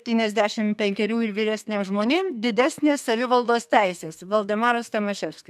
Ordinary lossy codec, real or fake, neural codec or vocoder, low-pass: AAC, 96 kbps; fake; codec, 32 kHz, 1.9 kbps, SNAC; 14.4 kHz